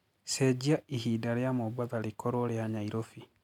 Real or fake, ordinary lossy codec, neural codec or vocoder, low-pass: real; MP3, 96 kbps; none; 19.8 kHz